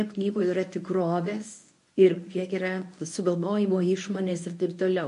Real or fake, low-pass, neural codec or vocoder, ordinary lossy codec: fake; 10.8 kHz; codec, 24 kHz, 0.9 kbps, WavTokenizer, medium speech release version 1; MP3, 48 kbps